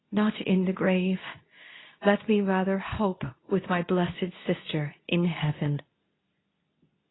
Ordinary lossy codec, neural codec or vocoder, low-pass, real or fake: AAC, 16 kbps; codec, 24 kHz, 0.9 kbps, WavTokenizer, medium speech release version 2; 7.2 kHz; fake